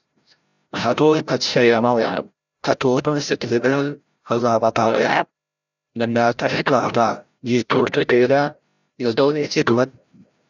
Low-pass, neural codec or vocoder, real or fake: 7.2 kHz; codec, 16 kHz, 0.5 kbps, FreqCodec, larger model; fake